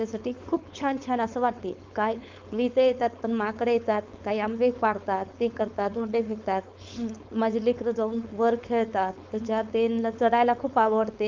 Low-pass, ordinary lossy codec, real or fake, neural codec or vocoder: 7.2 kHz; Opus, 32 kbps; fake; codec, 16 kHz, 4.8 kbps, FACodec